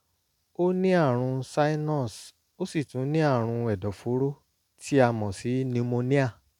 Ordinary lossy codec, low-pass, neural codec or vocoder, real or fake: none; 19.8 kHz; none; real